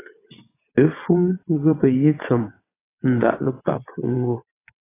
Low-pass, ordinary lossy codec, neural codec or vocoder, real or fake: 3.6 kHz; AAC, 16 kbps; vocoder, 22.05 kHz, 80 mel bands, WaveNeXt; fake